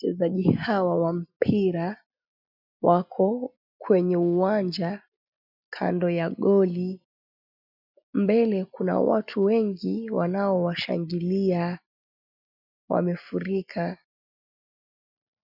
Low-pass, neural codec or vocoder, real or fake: 5.4 kHz; none; real